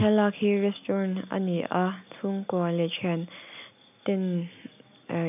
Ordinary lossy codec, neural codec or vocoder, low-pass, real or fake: none; none; 3.6 kHz; real